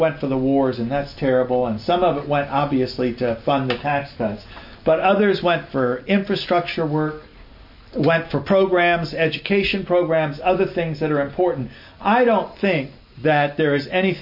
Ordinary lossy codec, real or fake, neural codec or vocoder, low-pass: MP3, 32 kbps; real; none; 5.4 kHz